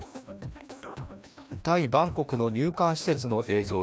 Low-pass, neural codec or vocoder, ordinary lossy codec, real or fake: none; codec, 16 kHz, 1 kbps, FreqCodec, larger model; none; fake